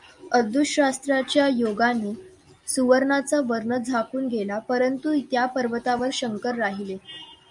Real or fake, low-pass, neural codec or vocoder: real; 10.8 kHz; none